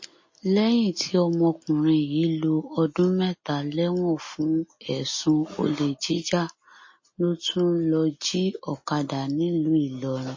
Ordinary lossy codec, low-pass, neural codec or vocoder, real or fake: MP3, 32 kbps; 7.2 kHz; none; real